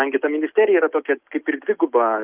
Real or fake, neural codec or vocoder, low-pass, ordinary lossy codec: real; none; 3.6 kHz; Opus, 24 kbps